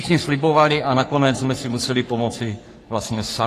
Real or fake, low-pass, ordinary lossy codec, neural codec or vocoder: fake; 14.4 kHz; AAC, 48 kbps; codec, 44.1 kHz, 3.4 kbps, Pupu-Codec